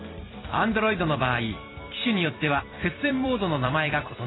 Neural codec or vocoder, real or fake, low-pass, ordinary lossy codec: vocoder, 44.1 kHz, 128 mel bands every 256 samples, BigVGAN v2; fake; 7.2 kHz; AAC, 16 kbps